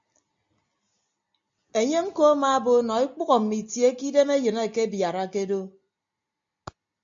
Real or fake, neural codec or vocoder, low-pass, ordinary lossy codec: real; none; 7.2 kHz; AAC, 64 kbps